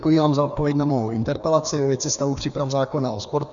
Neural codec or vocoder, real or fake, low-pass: codec, 16 kHz, 2 kbps, FreqCodec, larger model; fake; 7.2 kHz